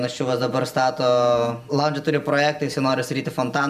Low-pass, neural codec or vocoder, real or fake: 14.4 kHz; none; real